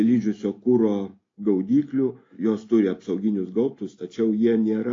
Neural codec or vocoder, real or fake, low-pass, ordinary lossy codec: none; real; 7.2 kHz; AAC, 32 kbps